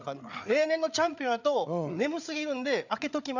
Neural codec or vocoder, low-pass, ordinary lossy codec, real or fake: codec, 16 kHz, 8 kbps, FreqCodec, larger model; 7.2 kHz; none; fake